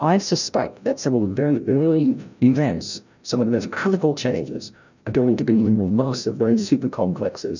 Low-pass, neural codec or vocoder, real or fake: 7.2 kHz; codec, 16 kHz, 0.5 kbps, FreqCodec, larger model; fake